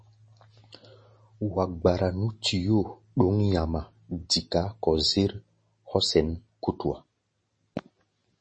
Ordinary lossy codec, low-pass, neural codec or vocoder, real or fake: MP3, 32 kbps; 9.9 kHz; none; real